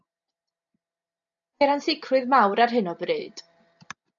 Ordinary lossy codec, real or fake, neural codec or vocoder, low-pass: MP3, 96 kbps; real; none; 7.2 kHz